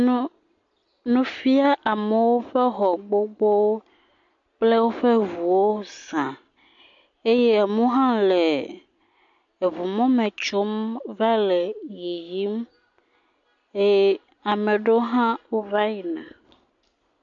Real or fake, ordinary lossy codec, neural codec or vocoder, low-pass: real; MP3, 48 kbps; none; 7.2 kHz